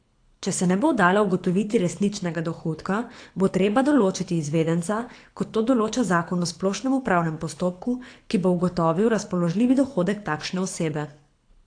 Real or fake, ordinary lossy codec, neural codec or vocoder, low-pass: fake; AAC, 48 kbps; codec, 24 kHz, 6 kbps, HILCodec; 9.9 kHz